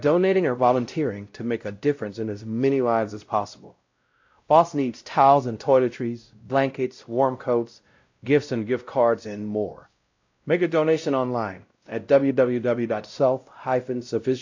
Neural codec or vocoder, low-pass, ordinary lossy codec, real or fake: codec, 16 kHz, 0.5 kbps, X-Codec, WavLM features, trained on Multilingual LibriSpeech; 7.2 kHz; AAC, 48 kbps; fake